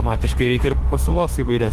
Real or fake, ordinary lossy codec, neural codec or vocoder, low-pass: fake; Opus, 24 kbps; autoencoder, 48 kHz, 32 numbers a frame, DAC-VAE, trained on Japanese speech; 14.4 kHz